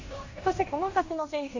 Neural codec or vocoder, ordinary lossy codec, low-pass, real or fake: codec, 16 kHz in and 24 kHz out, 0.9 kbps, LongCat-Audio-Codec, fine tuned four codebook decoder; AAC, 48 kbps; 7.2 kHz; fake